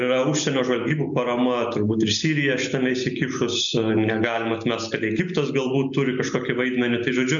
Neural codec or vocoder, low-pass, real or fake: none; 7.2 kHz; real